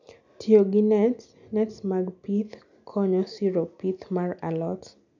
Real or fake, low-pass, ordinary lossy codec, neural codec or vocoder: real; 7.2 kHz; none; none